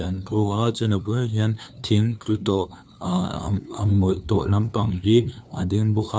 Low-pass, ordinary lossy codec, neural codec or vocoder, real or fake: none; none; codec, 16 kHz, 2 kbps, FunCodec, trained on LibriTTS, 25 frames a second; fake